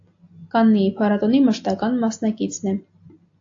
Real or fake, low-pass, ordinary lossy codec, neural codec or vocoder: real; 7.2 kHz; AAC, 48 kbps; none